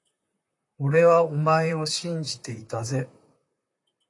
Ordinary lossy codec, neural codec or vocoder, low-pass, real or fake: AAC, 48 kbps; vocoder, 44.1 kHz, 128 mel bands, Pupu-Vocoder; 10.8 kHz; fake